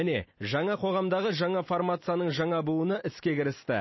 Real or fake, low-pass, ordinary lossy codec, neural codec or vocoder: real; 7.2 kHz; MP3, 24 kbps; none